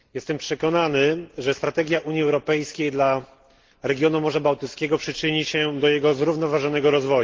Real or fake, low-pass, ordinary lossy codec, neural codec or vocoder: real; 7.2 kHz; Opus, 16 kbps; none